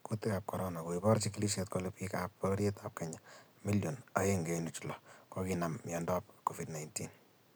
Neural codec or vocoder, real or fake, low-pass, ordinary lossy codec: none; real; none; none